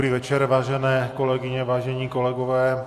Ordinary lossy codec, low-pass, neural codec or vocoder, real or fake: AAC, 64 kbps; 14.4 kHz; none; real